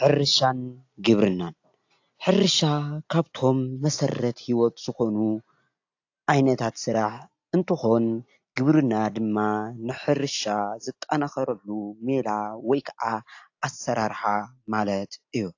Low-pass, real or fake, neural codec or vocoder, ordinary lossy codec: 7.2 kHz; real; none; AAC, 48 kbps